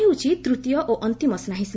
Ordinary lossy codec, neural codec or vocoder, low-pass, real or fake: none; none; none; real